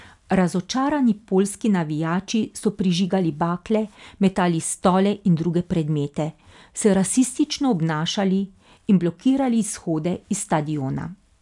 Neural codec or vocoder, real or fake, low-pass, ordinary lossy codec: none; real; 10.8 kHz; none